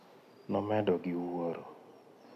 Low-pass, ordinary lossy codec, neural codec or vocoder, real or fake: 14.4 kHz; none; none; real